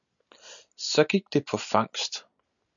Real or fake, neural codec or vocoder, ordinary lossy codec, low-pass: real; none; MP3, 96 kbps; 7.2 kHz